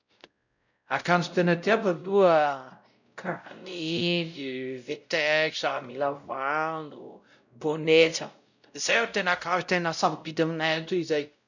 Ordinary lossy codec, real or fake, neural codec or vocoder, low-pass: none; fake; codec, 16 kHz, 0.5 kbps, X-Codec, WavLM features, trained on Multilingual LibriSpeech; 7.2 kHz